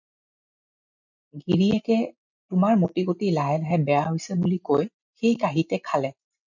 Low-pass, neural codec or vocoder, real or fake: 7.2 kHz; none; real